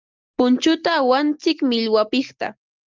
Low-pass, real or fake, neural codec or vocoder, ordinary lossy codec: 7.2 kHz; real; none; Opus, 32 kbps